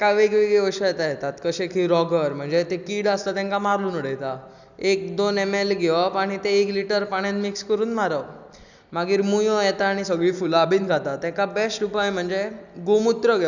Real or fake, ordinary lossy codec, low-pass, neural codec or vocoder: real; none; 7.2 kHz; none